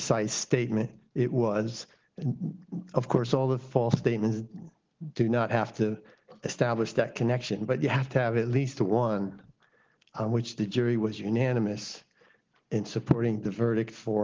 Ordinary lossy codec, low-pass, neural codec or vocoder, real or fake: Opus, 16 kbps; 7.2 kHz; none; real